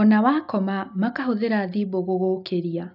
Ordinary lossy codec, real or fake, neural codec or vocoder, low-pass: none; real; none; 5.4 kHz